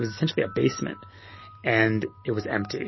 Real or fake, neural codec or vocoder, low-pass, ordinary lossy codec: real; none; 7.2 kHz; MP3, 24 kbps